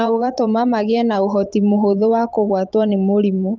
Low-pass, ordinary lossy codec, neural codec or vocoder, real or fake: 7.2 kHz; Opus, 24 kbps; vocoder, 44.1 kHz, 128 mel bands every 512 samples, BigVGAN v2; fake